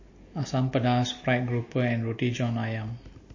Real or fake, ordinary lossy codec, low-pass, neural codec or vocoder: real; MP3, 32 kbps; 7.2 kHz; none